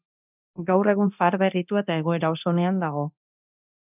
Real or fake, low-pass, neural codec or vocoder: fake; 3.6 kHz; codec, 24 kHz, 0.9 kbps, DualCodec